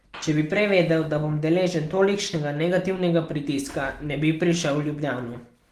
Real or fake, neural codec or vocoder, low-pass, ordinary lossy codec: real; none; 14.4 kHz; Opus, 24 kbps